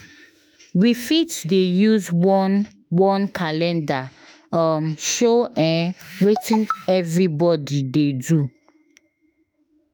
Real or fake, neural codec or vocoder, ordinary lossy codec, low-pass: fake; autoencoder, 48 kHz, 32 numbers a frame, DAC-VAE, trained on Japanese speech; none; none